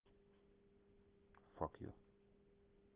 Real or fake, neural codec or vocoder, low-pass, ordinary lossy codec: real; none; 3.6 kHz; Opus, 16 kbps